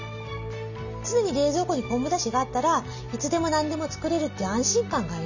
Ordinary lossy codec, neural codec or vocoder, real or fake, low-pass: none; none; real; 7.2 kHz